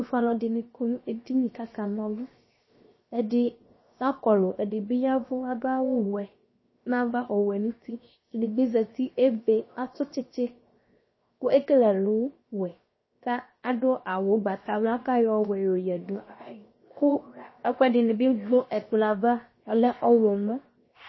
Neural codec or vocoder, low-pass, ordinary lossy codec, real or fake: codec, 16 kHz, 0.7 kbps, FocalCodec; 7.2 kHz; MP3, 24 kbps; fake